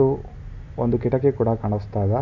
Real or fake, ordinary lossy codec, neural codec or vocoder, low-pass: real; none; none; 7.2 kHz